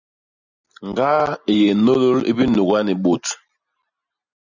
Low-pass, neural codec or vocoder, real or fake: 7.2 kHz; none; real